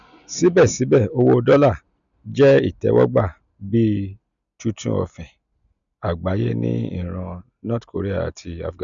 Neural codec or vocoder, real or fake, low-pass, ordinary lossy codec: none; real; 7.2 kHz; none